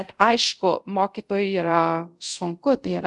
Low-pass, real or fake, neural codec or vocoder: 10.8 kHz; fake; codec, 24 kHz, 0.5 kbps, DualCodec